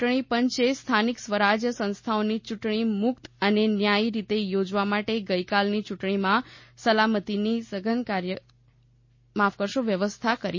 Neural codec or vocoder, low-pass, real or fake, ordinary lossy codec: none; 7.2 kHz; real; MP3, 32 kbps